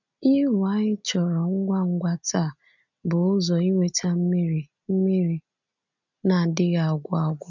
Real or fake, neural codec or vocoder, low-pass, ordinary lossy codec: real; none; 7.2 kHz; none